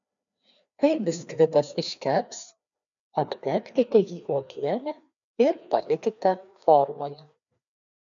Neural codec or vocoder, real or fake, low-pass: codec, 16 kHz, 2 kbps, FreqCodec, larger model; fake; 7.2 kHz